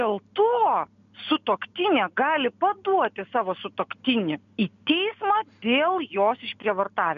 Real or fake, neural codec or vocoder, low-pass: real; none; 7.2 kHz